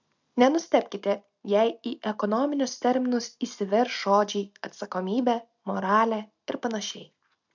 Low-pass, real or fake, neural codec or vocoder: 7.2 kHz; real; none